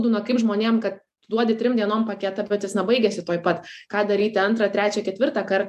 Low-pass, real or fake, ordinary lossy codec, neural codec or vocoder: 14.4 kHz; real; AAC, 96 kbps; none